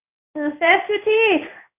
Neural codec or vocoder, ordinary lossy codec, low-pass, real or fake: codec, 16 kHz in and 24 kHz out, 1 kbps, XY-Tokenizer; none; 3.6 kHz; fake